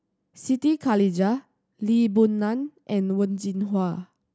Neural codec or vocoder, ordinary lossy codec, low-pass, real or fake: none; none; none; real